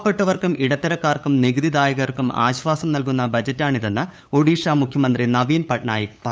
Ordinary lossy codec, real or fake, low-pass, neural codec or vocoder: none; fake; none; codec, 16 kHz, 8 kbps, FunCodec, trained on LibriTTS, 25 frames a second